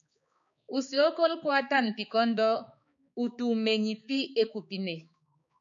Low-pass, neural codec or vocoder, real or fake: 7.2 kHz; codec, 16 kHz, 4 kbps, X-Codec, HuBERT features, trained on balanced general audio; fake